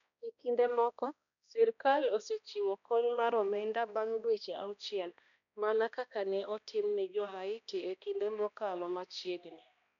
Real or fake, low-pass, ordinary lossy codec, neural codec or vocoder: fake; 7.2 kHz; none; codec, 16 kHz, 1 kbps, X-Codec, HuBERT features, trained on balanced general audio